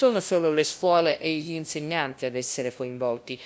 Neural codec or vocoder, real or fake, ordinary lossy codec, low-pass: codec, 16 kHz, 0.5 kbps, FunCodec, trained on LibriTTS, 25 frames a second; fake; none; none